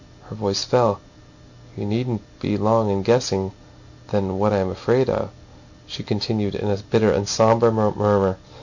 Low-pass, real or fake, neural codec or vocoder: 7.2 kHz; real; none